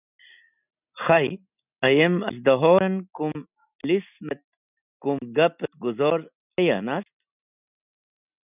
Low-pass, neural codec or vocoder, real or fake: 3.6 kHz; none; real